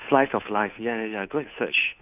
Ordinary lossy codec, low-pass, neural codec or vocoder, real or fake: none; 3.6 kHz; codec, 16 kHz, 2 kbps, FunCodec, trained on Chinese and English, 25 frames a second; fake